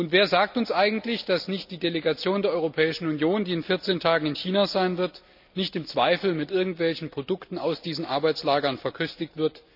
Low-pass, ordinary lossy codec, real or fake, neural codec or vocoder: 5.4 kHz; none; real; none